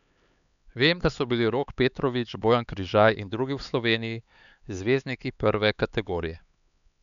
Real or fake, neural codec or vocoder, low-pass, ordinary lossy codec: fake; codec, 16 kHz, 4 kbps, X-Codec, HuBERT features, trained on LibriSpeech; 7.2 kHz; none